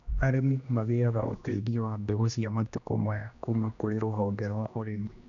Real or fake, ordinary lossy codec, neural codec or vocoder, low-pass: fake; none; codec, 16 kHz, 1 kbps, X-Codec, HuBERT features, trained on general audio; 7.2 kHz